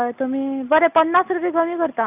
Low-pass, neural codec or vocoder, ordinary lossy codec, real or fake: 3.6 kHz; none; none; real